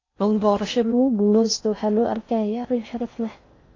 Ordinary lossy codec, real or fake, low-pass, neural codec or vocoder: AAC, 32 kbps; fake; 7.2 kHz; codec, 16 kHz in and 24 kHz out, 0.6 kbps, FocalCodec, streaming, 4096 codes